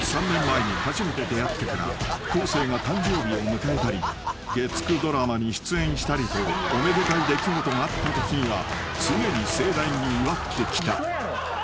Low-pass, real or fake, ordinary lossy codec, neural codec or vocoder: none; real; none; none